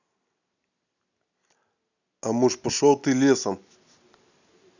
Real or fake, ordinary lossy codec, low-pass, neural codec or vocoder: real; none; 7.2 kHz; none